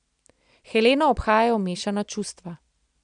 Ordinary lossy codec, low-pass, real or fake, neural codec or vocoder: none; 9.9 kHz; real; none